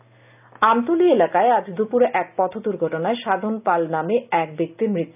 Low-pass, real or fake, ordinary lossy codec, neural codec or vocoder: 3.6 kHz; real; none; none